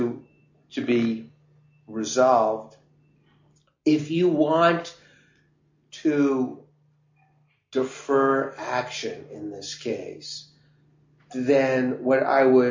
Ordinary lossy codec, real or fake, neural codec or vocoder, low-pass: MP3, 48 kbps; real; none; 7.2 kHz